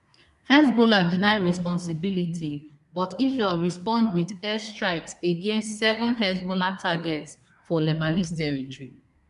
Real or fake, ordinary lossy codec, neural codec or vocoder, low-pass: fake; none; codec, 24 kHz, 1 kbps, SNAC; 10.8 kHz